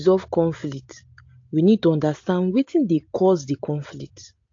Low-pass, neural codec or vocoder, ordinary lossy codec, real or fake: 7.2 kHz; none; AAC, 48 kbps; real